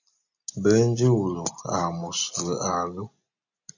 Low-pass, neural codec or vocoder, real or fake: 7.2 kHz; none; real